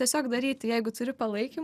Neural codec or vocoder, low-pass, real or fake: none; 14.4 kHz; real